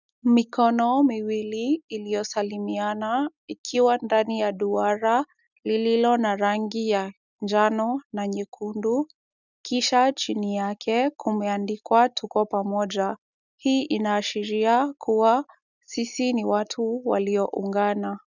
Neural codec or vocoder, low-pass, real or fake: none; 7.2 kHz; real